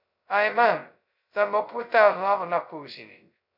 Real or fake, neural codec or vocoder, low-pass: fake; codec, 16 kHz, 0.2 kbps, FocalCodec; 5.4 kHz